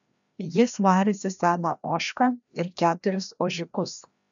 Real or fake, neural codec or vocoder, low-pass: fake; codec, 16 kHz, 1 kbps, FreqCodec, larger model; 7.2 kHz